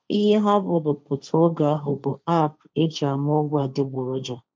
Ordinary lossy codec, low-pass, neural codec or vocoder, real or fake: none; none; codec, 16 kHz, 1.1 kbps, Voila-Tokenizer; fake